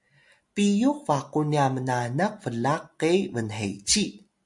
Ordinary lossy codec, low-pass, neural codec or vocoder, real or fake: MP3, 64 kbps; 10.8 kHz; none; real